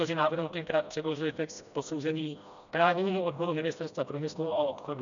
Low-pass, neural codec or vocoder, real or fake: 7.2 kHz; codec, 16 kHz, 1 kbps, FreqCodec, smaller model; fake